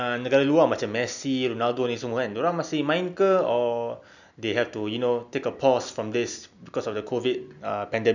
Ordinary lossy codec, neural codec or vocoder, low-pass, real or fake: none; none; 7.2 kHz; real